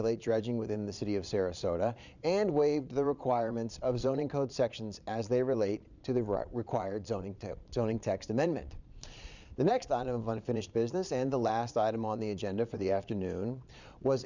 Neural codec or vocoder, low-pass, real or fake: vocoder, 22.05 kHz, 80 mel bands, Vocos; 7.2 kHz; fake